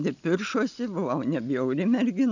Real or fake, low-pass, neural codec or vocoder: real; 7.2 kHz; none